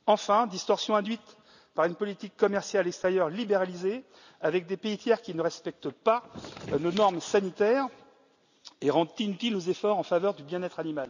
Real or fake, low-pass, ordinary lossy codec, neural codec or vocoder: real; 7.2 kHz; none; none